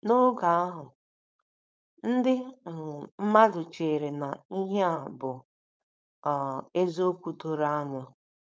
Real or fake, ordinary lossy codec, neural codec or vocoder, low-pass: fake; none; codec, 16 kHz, 4.8 kbps, FACodec; none